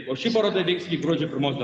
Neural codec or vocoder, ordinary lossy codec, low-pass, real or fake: none; Opus, 64 kbps; 10.8 kHz; real